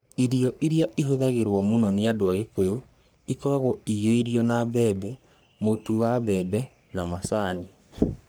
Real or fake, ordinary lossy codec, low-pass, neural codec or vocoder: fake; none; none; codec, 44.1 kHz, 3.4 kbps, Pupu-Codec